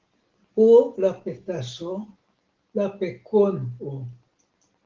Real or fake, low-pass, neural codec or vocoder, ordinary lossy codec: real; 7.2 kHz; none; Opus, 16 kbps